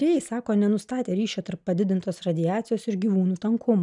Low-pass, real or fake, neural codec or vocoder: 10.8 kHz; real; none